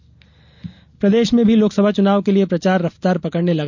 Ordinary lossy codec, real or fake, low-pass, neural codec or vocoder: none; real; 7.2 kHz; none